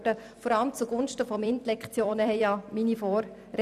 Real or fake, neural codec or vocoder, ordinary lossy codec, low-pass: fake; vocoder, 44.1 kHz, 128 mel bands every 256 samples, BigVGAN v2; none; 14.4 kHz